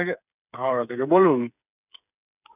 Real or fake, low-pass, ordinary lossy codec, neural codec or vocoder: fake; 3.6 kHz; none; codec, 16 kHz, 8 kbps, FreqCodec, smaller model